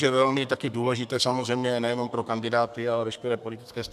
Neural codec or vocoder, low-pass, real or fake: codec, 32 kHz, 1.9 kbps, SNAC; 14.4 kHz; fake